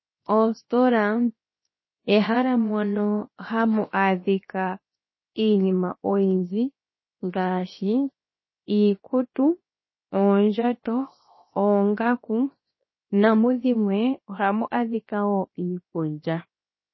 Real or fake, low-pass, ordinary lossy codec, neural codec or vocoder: fake; 7.2 kHz; MP3, 24 kbps; codec, 16 kHz, 0.7 kbps, FocalCodec